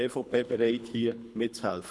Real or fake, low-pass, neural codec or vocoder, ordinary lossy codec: fake; none; codec, 24 kHz, 3 kbps, HILCodec; none